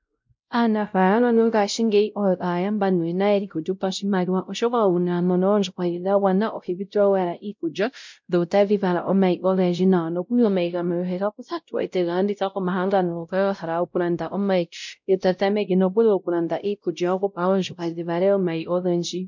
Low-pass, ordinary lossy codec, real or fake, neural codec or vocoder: 7.2 kHz; MP3, 64 kbps; fake; codec, 16 kHz, 0.5 kbps, X-Codec, WavLM features, trained on Multilingual LibriSpeech